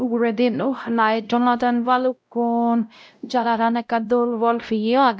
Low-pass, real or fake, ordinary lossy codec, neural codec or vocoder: none; fake; none; codec, 16 kHz, 0.5 kbps, X-Codec, WavLM features, trained on Multilingual LibriSpeech